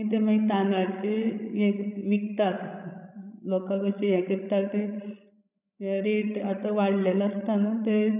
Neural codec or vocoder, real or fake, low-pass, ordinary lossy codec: codec, 16 kHz, 16 kbps, FreqCodec, larger model; fake; 3.6 kHz; none